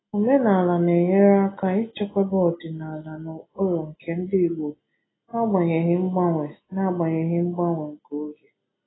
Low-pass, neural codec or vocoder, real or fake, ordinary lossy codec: 7.2 kHz; none; real; AAC, 16 kbps